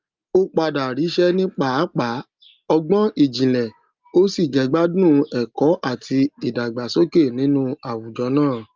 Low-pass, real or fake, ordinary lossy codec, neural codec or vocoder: 7.2 kHz; real; Opus, 24 kbps; none